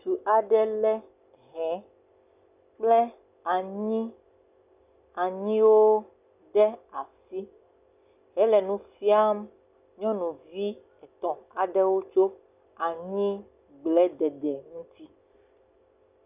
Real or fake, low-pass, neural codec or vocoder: real; 3.6 kHz; none